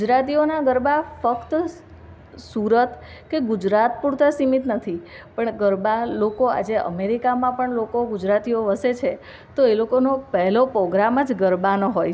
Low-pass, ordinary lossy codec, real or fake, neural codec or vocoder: none; none; real; none